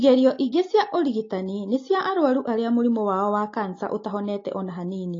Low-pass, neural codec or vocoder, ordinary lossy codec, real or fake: 7.2 kHz; none; AAC, 32 kbps; real